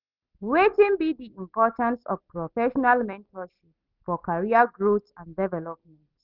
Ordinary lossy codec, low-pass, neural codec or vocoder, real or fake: Opus, 24 kbps; 5.4 kHz; none; real